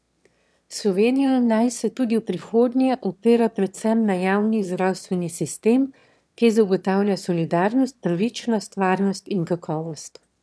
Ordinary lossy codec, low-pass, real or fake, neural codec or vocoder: none; none; fake; autoencoder, 22.05 kHz, a latent of 192 numbers a frame, VITS, trained on one speaker